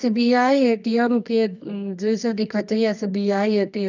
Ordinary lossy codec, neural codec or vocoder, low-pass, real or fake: none; codec, 24 kHz, 0.9 kbps, WavTokenizer, medium music audio release; 7.2 kHz; fake